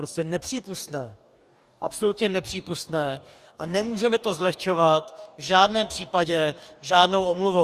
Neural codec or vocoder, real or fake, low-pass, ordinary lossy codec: codec, 44.1 kHz, 2.6 kbps, DAC; fake; 14.4 kHz; Opus, 64 kbps